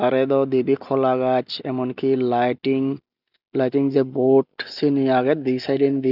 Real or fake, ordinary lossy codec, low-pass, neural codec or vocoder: real; none; 5.4 kHz; none